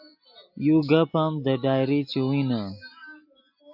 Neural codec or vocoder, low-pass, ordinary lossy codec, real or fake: none; 5.4 kHz; AAC, 48 kbps; real